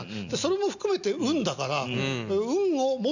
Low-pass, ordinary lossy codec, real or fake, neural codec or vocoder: 7.2 kHz; none; real; none